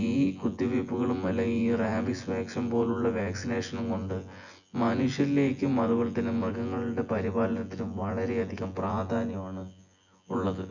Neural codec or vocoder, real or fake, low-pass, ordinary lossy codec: vocoder, 24 kHz, 100 mel bands, Vocos; fake; 7.2 kHz; none